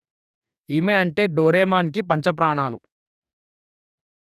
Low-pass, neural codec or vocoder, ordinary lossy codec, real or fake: 14.4 kHz; codec, 44.1 kHz, 2.6 kbps, DAC; none; fake